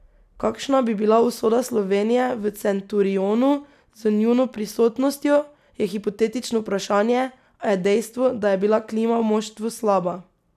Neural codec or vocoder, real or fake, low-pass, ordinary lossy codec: none; real; 14.4 kHz; none